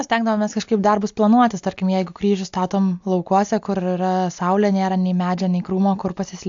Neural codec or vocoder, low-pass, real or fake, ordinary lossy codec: none; 7.2 kHz; real; AAC, 64 kbps